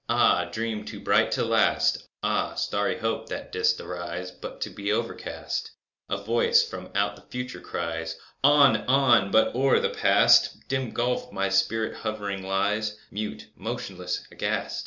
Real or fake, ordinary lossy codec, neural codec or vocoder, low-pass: real; Opus, 64 kbps; none; 7.2 kHz